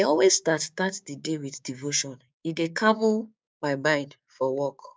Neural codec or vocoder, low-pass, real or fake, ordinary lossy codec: codec, 16 kHz, 6 kbps, DAC; none; fake; none